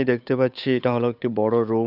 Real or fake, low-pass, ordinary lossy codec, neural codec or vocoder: fake; 5.4 kHz; none; codec, 16 kHz, 8 kbps, FunCodec, trained on Chinese and English, 25 frames a second